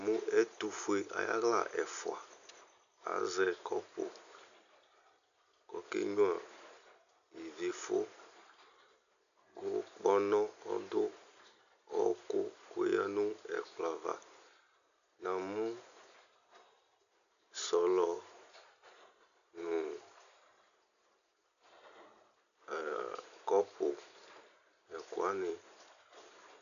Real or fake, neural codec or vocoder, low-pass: real; none; 7.2 kHz